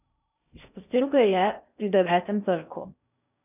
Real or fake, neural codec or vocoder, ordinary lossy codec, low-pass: fake; codec, 16 kHz in and 24 kHz out, 0.6 kbps, FocalCodec, streaming, 2048 codes; none; 3.6 kHz